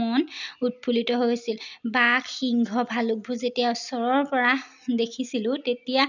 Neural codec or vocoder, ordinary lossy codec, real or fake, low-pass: none; none; real; 7.2 kHz